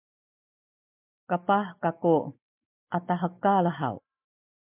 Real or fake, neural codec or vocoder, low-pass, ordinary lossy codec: real; none; 3.6 kHz; MP3, 32 kbps